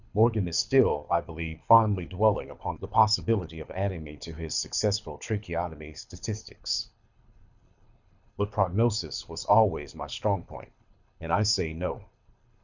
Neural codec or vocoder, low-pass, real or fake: codec, 24 kHz, 6 kbps, HILCodec; 7.2 kHz; fake